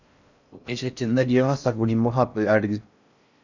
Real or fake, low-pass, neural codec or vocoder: fake; 7.2 kHz; codec, 16 kHz in and 24 kHz out, 0.6 kbps, FocalCodec, streaming, 4096 codes